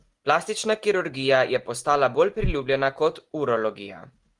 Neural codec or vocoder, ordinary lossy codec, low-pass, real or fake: none; Opus, 24 kbps; 10.8 kHz; real